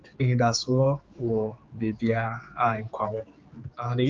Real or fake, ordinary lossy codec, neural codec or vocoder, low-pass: fake; Opus, 24 kbps; codec, 16 kHz, 4 kbps, X-Codec, HuBERT features, trained on general audio; 7.2 kHz